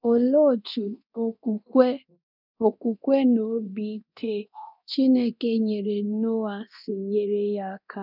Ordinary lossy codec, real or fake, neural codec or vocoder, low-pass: none; fake; codec, 24 kHz, 0.9 kbps, DualCodec; 5.4 kHz